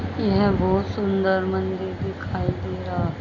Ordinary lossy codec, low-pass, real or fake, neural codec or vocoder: none; 7.2 kHz; real; none